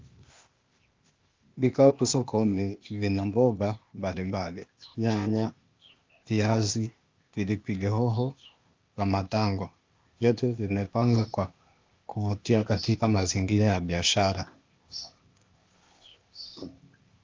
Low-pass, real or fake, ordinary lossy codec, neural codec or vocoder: 7.2 kHz; fake; Opus, 24 kbps; codec, 16 kHz, 0.8 kbps, ZipCodec